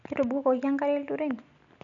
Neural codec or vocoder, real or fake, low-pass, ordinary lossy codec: none; real; 7.2 kHz; none